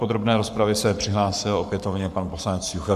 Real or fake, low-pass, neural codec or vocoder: fake; 14.4 kHz; codec, 44.1 kHz, 7.8 kbps, Pupu-Codec